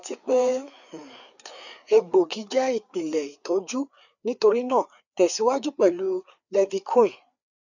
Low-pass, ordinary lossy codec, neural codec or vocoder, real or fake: 7.2 kHz; none; codec, 16 kHz, 4 kbps, FreqCodec, larger model; fake